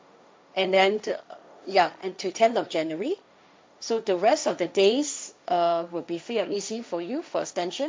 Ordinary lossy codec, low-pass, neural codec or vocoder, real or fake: none; none; codec, 16 kHz, 1.1 kbps, Voila-Tokenizer; fake